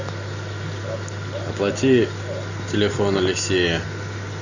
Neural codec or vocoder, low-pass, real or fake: none; 7.2 kHz; real